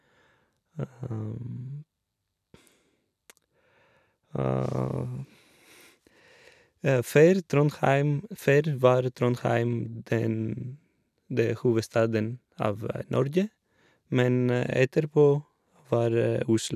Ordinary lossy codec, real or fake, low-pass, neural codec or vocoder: none; real; 14.4 kHz; none